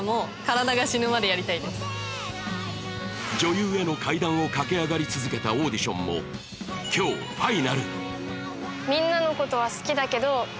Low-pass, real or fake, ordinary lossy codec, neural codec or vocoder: none; real; none; none